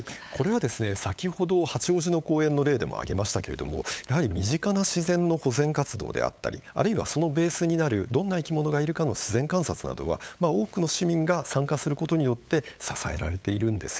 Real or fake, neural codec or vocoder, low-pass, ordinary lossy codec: fake; codec, 16 kHz, 8 kbps, FunCodec, trained on LibriTTS, 25 frames a second; none; none